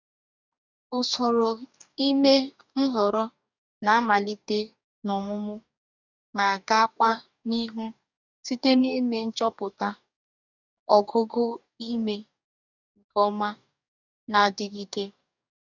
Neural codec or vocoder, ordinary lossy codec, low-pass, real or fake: codec, 44.1 kHz, 2.6 kbps, DAC; none; 7.2 kHz; fake